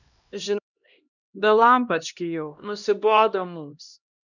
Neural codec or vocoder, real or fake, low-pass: codec, 16 kHz, 1 kbps, X-Codec, HuBERT features, trained on LibriSpeech; fake; 7.2 kHz